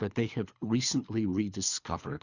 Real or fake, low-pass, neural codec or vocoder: fake; 7.2 kHz; codec, 24 kHz, 3 kbps, HILCodec